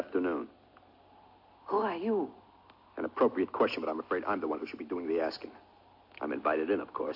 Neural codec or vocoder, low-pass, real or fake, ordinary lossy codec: none; 5.4 kHz; real; AAC, 48 kbps